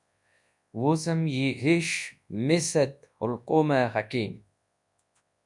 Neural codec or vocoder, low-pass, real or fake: codec, 24 kHz, 0.9 kbps, WavTokenizer, large speech release; 10.8 kHz; fake